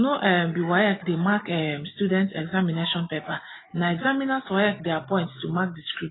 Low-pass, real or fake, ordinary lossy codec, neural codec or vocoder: 7.2 kHz; real; AAC, 16 kbps; none